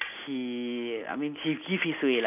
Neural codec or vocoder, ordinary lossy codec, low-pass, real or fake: none; none; 3.6 kHz; real